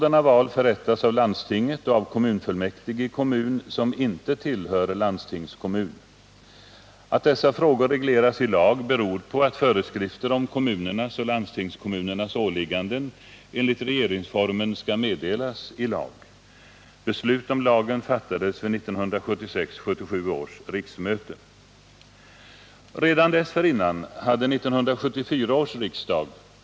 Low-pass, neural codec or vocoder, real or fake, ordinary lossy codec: none; none; real; none